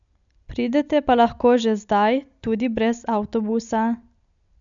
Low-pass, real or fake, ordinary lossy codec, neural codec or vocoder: 7.2 kHz; real; none; none